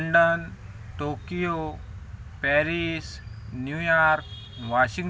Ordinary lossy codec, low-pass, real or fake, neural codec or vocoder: none; none; real; none